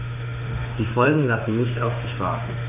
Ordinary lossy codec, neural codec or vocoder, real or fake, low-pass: none; autoencoder, 48 kHz, 32 numbers a frame, DAC-VAE, trained on Japanese speech; fake; 3.6 kHz